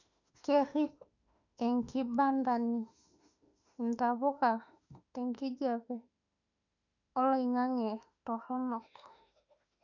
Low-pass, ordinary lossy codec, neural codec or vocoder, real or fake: 7.2 kHz; none; autoencoder, 48 kHz, 32 numbers a frame, DAC-VAE, trained on Japanese speech; fake